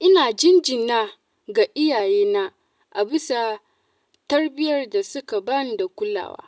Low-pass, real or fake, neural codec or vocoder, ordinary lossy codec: none; real; none; none